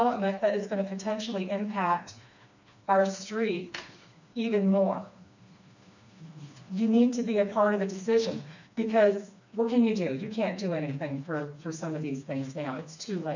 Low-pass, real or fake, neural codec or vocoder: 7.2 kHz; fake; codec, 16 kHz, 2 kbps, FreqCodec, smaller model